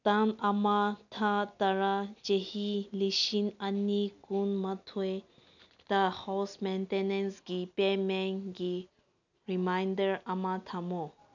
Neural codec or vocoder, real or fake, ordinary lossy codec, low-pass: none; real; none; 7.2 kHz